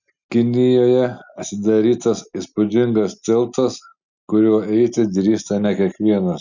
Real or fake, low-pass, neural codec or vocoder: real; 7.2 kHz; none